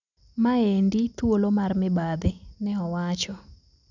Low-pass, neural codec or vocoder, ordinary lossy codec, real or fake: 7.2 kHz; none; none; real